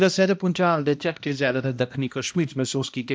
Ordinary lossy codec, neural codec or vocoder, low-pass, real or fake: none; codec, 16 kHz, 1 kbps, X-Codec, HuBERT features, trained on balanced general audio; none; fake